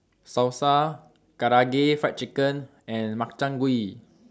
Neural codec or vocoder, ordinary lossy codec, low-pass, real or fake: none; none; none; real